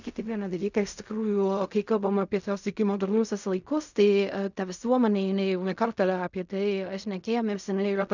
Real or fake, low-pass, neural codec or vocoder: fake; 7.2 kHz; codec, 16 kHz in and 24 kHz out, 0.4 kbps, LongCat-Audio-Codec, fine tuned four codebook decoder